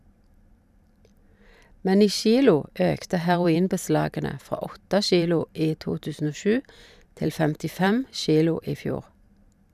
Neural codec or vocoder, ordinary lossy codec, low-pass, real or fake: vocoder, 44.1 kHz, 128 mel bands every 512 samples, BigVGAN v2; none; 14.4 kHz; fake